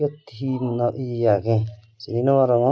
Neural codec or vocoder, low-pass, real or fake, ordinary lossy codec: none; none; real; none